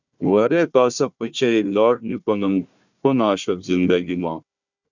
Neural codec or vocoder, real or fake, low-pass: codec, 16 kHz, 1 kbps, FunCodec, trained on Chinese and English, 50 frames a second; fake; 7.2 kHz